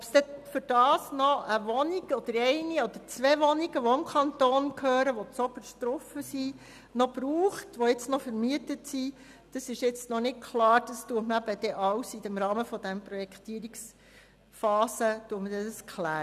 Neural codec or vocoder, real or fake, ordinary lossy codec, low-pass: none; real; none; 14.4 kHz